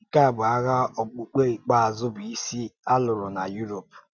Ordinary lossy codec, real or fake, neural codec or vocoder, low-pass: none; real; none; 7.2 kHz